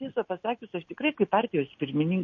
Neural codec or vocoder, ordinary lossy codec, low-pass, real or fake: none; MP3, 32 kbps; 7.2 kHz; real